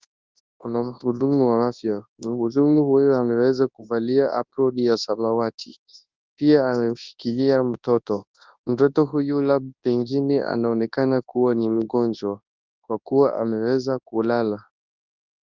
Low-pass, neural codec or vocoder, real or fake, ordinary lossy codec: 7.2 kHz; codec, 24 kHz, 0.9 kbps, WavTokenizer, large speech release; fake; Opus, 24 kbps